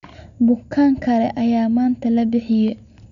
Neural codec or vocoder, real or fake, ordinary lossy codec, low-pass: none; real; none; 7.2 kHz